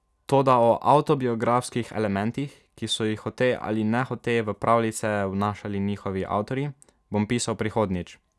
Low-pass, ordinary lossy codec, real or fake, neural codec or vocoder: none; none; real; none